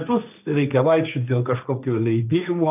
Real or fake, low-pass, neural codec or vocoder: fake; 3.6 kHz; codec, 16 kHz, 1.1 kbps, Voila-Tokenizer